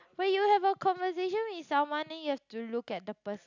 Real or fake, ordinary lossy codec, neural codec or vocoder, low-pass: real; none; none; 7.2 kHz